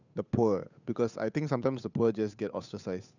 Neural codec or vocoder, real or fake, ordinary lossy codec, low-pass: codec, 16 kHz, 8 kbps, FunCodec, trained on Chinese and English, 25 frames a second; fake; none; 7.2 kHz